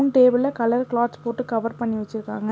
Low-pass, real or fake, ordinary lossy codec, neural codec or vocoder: none; real; none; none